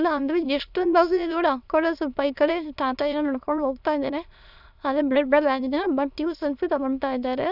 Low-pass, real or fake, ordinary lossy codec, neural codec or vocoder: 5.4 kHz; fake; none; autoencoder, 22.05 kHz, a latent of 192 numbers a frame, VITS, trained on many speakers